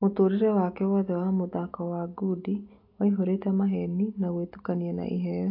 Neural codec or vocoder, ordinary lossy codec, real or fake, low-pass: none; AAC, 32 kbps; real; 5.4 kHz